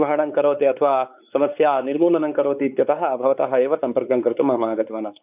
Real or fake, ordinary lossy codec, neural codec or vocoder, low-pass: fake; none; codec, 16 kHz, 4 kbps, X-Codec, WavLM features, trained on Multilingual LibriSpeech; 3.6 kHz